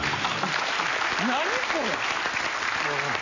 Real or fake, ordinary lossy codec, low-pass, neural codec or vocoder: real; none; 7.2 kHz; none